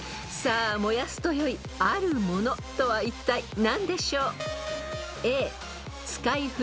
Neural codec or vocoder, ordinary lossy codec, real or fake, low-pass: none; none; real; none